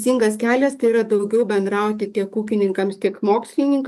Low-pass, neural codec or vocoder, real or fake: 14.4 kHz; codec, 44.1 kHz, 7.8 kbps, DAC; fake